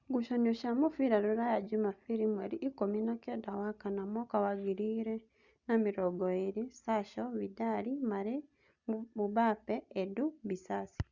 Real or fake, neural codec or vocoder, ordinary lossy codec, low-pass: real; none; Opus, 64 kbps; 7.2 kHz